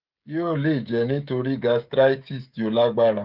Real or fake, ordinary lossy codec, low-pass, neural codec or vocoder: fake; Opus, 32 kbps; 5.4 kHz; codec, 16 kHz, 16 kbps, FreqCodec, smaller model